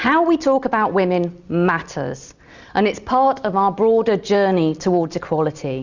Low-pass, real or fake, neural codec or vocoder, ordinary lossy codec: 7.2 kHz; real; none; Opus, 64 kbps